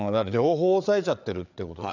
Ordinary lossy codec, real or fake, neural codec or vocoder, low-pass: none; fake; codec, 16 kHz, 8 kbps, FreqCodec, larger model; 7.2 kHz